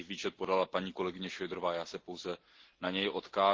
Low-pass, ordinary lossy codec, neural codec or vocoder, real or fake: 7.2 kHz; Opus, 16 kbps; none; real